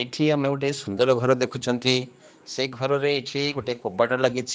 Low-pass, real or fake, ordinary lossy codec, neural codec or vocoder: none; fake; none; codec, 16 kHz, 2 kbps, X-Codec, HuBERT features, trained on general audio